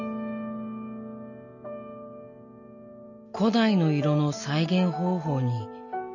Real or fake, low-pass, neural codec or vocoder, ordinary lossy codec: real; 7.2 kHz; none; none